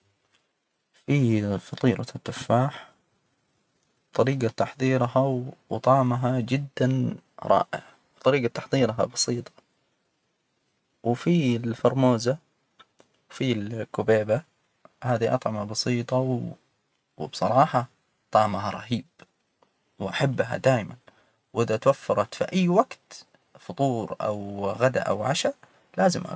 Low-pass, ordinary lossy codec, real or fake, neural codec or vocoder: none; none; real; none